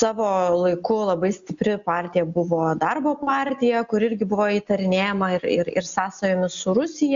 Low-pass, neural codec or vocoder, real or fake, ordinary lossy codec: 7.2 kHz; none; real; Opus, 64 kbps